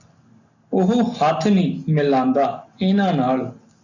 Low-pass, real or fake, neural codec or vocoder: 7.2 kHz; real; none